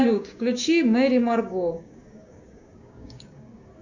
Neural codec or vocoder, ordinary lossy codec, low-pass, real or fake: codec, 16 kHz, 6 kbps, DAC; Opus, 64 kbps; 7.2 kHz; fake